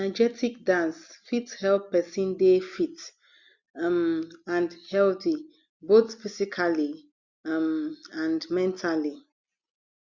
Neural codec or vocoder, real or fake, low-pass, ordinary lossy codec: none; real; 7.2 kHz; Opus, 64 kbps